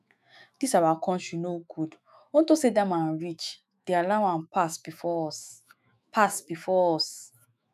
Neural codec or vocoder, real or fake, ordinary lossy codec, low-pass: autoencoder, 48 kHz, 128 numbers a frame, DAC-VAE, trained on Japanese speech; fake; none; 14.4 kHz